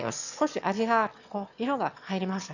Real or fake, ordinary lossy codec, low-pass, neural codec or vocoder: fake; none; 7.2 kHz; autoencoder, 22.05 kHz, a latent of 192 numbers a frame, VITS, trained on one speaker